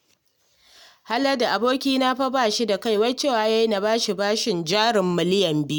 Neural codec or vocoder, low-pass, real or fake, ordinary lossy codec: none; 19.8 kHz; real; none